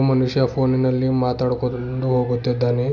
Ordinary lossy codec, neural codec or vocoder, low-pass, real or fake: none; none; 7.2 kHz; real